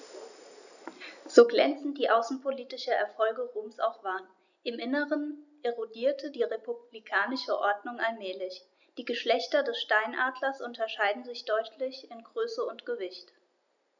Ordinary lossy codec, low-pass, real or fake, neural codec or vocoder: none; 7.2 kHz; real; none